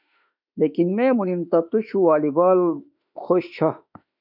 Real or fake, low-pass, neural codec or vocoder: fake; 5.4 kHz; autoencoder, 48 kHz, 32 numbers a frame, DAC-VAE, trained on Japanese speech